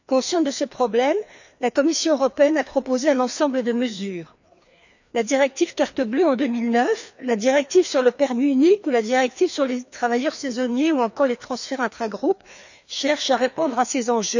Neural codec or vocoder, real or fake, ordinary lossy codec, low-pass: codec, 16 kHz, 2 kbps, FreqCodec, larger model; fake; none; 7.2 kHz